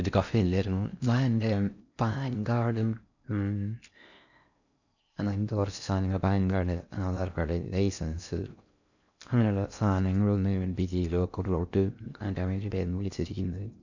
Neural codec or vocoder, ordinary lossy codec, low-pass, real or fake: codec, 16 kHz in and 24 kHz out, 0.6 kbps, FocalCodec, streaming, 4096 codes; none; 7.2 kHz; fake